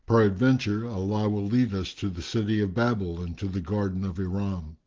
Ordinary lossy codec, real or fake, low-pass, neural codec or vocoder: Opus, 16 kbps; real; 7.2 kHz; none